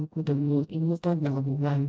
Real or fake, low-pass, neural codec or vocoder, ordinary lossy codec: fake; none; codec, 16 kHz, 0.5 kbps, FreqCodec, smaller model; none